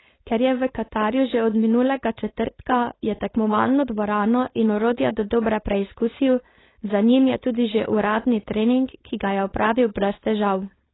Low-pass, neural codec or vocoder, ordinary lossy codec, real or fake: 7.2 kHz; codec, 16 kHz, 4.8 kbps, FACodec; AAC, 16 kbps; fake